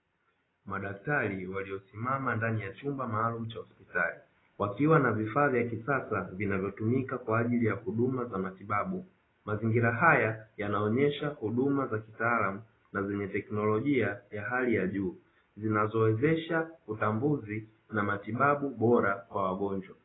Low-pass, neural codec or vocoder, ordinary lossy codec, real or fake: 7.2 kHz; none; AAC, 16 kbps; real